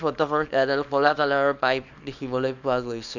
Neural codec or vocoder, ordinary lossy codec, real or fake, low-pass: codec, 24 kHz, 0.9 kbps, WavTokenizer, small release; none; fake; 7.2 kHz